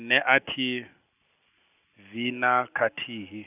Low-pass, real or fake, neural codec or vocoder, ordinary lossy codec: 3.6 kHz; real; none; none